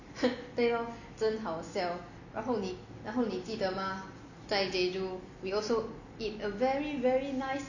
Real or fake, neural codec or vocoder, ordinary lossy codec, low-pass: real; none; none; 7.2 kHz